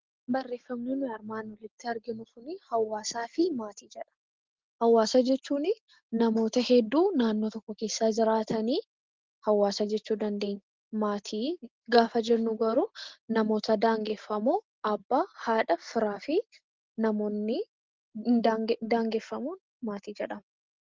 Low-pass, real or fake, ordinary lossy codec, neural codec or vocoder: 7.2 kHz; real; Opus, 16 kbps; none